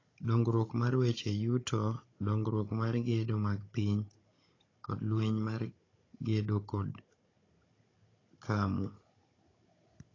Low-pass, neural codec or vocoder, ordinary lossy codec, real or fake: 7.2 kHz; codec, 16 kHz, 16 kbps, FunCodec, trained on Chinese and English, 50 frames a second; AAC, 32 kbps; fake